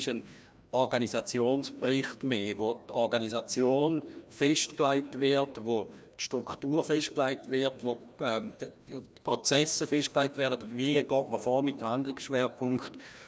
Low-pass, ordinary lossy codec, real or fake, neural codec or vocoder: none; none; fake; codec, 16 kHz, 1 kbps, FreqCodec, larger model